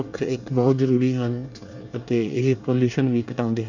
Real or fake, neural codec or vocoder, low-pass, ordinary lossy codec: fake; codec, 24 kHz, 1 kbps, SNAC; 7.2 kHz; none